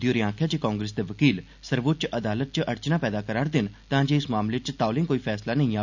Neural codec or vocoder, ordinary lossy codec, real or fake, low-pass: none; none; real; 7.2 kHz